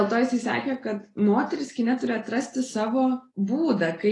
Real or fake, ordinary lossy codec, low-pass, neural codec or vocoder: real; AAC, 32 kbps; 10.8 kHz; none